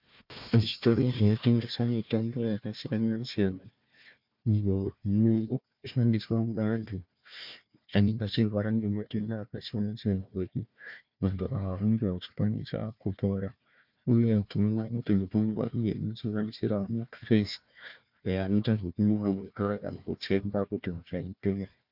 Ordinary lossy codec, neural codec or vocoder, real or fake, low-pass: MP3, 48 kbps; codec, 16 kHz, 1 kbps, FunCodec, trained on Chinese and English, 50 frames a second; fake; 5.4 kHz